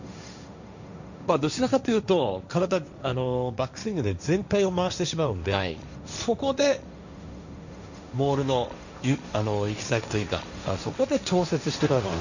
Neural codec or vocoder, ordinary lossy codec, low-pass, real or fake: codec, 16 kHz, 1.1 kbps, Voila-Tokenizer; none; 7.2 kHz; fake